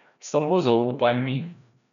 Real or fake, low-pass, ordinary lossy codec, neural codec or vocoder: fake; 7.2 kHz; none; codec, 16 kHz, 1 kbps, FreqCodec, larger model